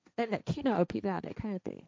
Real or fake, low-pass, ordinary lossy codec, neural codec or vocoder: fake; none; none; codec, 16 kHz, 1.1 kbps, Voila-Tokenizer